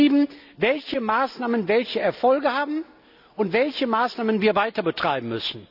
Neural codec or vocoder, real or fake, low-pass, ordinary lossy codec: none; real; 5.4 kHz; none